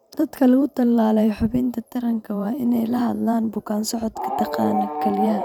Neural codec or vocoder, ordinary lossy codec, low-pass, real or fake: vocoder, 44.1 kHz, 128 mel bands every 512 samples, BigVGAN v2; none; 19.8 kHz; fake